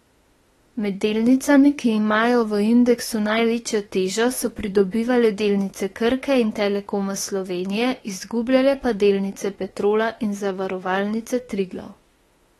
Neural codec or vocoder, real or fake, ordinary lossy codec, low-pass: autoencoder, 48 kHz, 32 numbers a frame, DAC-VAE, trained on Japanese speech; fake; AAC, 32 kbps; 19.8 kHz